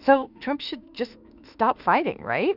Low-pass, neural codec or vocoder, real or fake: 5.4 kHz; autoencoder, 48 kHz, 32 numbers a frame, DAC-VAE, trained on Japanese speech; fake